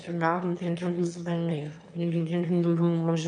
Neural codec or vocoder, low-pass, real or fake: autoencoder, 22.05 kHz, a latent of 192 numbers a frame, VITS, trained on one speaker; 9.9 kHz; fake